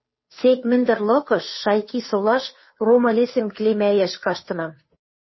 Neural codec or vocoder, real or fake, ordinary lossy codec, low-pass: codec, 16 kHz, 2 kbps, FunCodec, trained on Chinese and English, 25 frames a second; fake; MP3, 24 kbps; 7.2 kHz